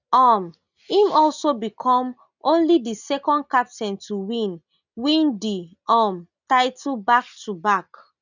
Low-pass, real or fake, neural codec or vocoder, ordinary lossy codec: 7.2 kHz; real; none; none